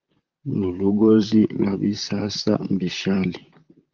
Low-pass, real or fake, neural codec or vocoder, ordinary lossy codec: 7.2 kHz; fake; vocoder, 44.1 kHz, 128 mel bands, Pupu-Vocoder; Opus, 24 kbps